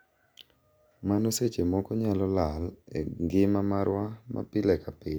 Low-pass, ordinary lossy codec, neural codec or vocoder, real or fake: none; none; none; real